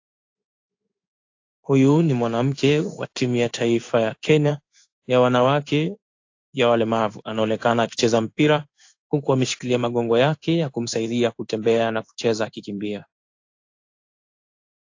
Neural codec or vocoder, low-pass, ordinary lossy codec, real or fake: codec, 16 kHz in and 24 kHz out, 1 kbps, XY-Tokenizer; 7.2 kHz; AAC, 48 kbps; fake